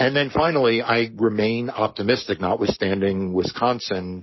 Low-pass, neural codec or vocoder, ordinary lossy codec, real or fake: 7.2 kHz; codec, 44.1 kHz, 7.8 kbps, Pupu-Codec; MP3, 24 kbps; fake